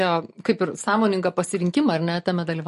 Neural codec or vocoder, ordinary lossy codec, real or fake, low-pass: none; MP3, 48 kbps; real; 14.4 kHz